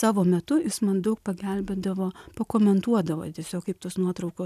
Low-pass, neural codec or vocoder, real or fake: 14.4 kHz; none; real